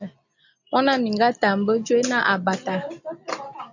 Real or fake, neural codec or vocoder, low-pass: real; none; 7.2 kHz